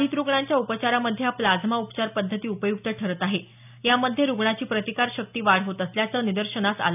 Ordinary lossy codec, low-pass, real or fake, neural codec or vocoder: none; 3.6 kHz; real; none